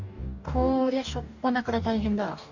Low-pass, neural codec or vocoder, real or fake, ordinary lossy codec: 7.2 kHz; codec, 44.1 kHz, 2.6 kbps, DAC; fake; none